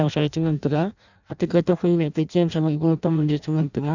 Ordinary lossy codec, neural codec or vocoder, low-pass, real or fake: none; codec, 16 kHz in and 24 kHz out, 0.6 kbps, FireRedTTS-2 codec; 7.2 kHz; fake